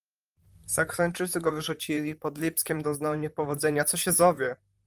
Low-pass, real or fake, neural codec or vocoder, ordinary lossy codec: 14.4 kHz; fake; vocoder, 44.1 kHz, 128 mel bands, Pupu-Vocoder; Opus, 32 kbps